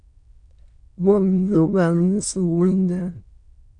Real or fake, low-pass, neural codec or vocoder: fake; 9.9 kHz; autoencoder, 22.05 kHz, a latent of 192 numbers a frame, VITS, trained on many speakers